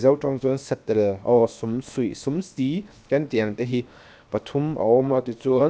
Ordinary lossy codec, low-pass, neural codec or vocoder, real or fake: none; none; codec, 16 kHz, 0.7 kbps, FocalCodec; fake